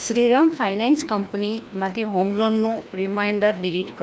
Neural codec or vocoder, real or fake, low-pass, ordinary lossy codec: codec, 16 kHz, 1 kbps, FreqCodec, larger model; fake; none; none